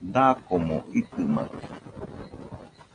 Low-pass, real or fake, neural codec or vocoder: 9.9 kHz; real; none